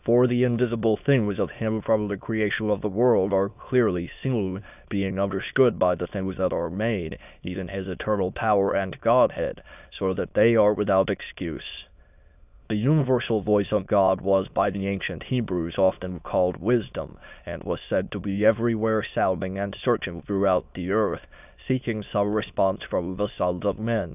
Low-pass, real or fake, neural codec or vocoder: 3.6 kHz; fake; autoencoder, 22.05 kHz, a latent of 192 numbers a frame, VITS, trained on many speakers